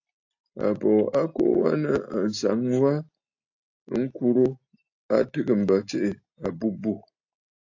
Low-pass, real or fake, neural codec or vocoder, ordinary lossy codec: 7.2 kHz; real; none; AAC, 48 kbps